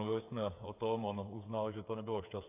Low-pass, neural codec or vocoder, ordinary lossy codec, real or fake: 3.6 kHz; codec, 16 kHz, 8 kbps, FreqCodec, smaller model; AAC, 32 kbps; fake